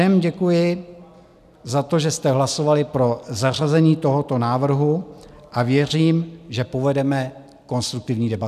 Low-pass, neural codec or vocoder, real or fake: 14.4 kHz; none; real